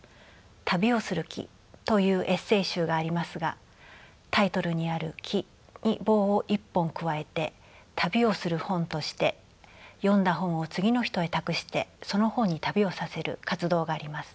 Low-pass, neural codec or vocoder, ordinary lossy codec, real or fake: none; none; none; real